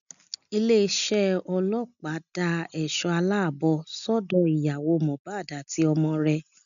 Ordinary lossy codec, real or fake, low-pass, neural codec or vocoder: MP3, 96 kbps; real; 7.2 kHz; none